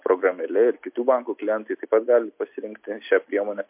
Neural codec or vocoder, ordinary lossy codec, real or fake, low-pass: none; MP3, 32 kbps; real; 3.6 kHz